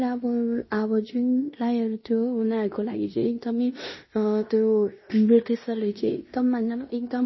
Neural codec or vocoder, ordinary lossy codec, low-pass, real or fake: codec, 16 kHz in and 24 kHz out, 0.9 kbps, LongCat-Audio-Codec, fine tuned four codebook decoder; MP3, 24 kbps; 7.2 kHz; fake